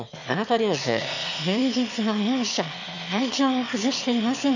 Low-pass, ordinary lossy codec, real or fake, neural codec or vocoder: 7.2 kHz; none; fake; autoencoder, 22.05 kHz, a latent of 192 numbers a frame, VITS, trained on one speaker